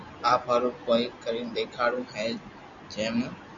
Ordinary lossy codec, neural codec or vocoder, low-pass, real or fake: Opus, 64 kbps; none; 7.2 kHz; real